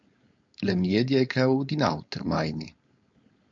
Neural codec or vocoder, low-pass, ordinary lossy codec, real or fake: codec, 16 kHz, 4.8 kbps, FACodec; 7.2 kHz; MP3, 48 kbps; fake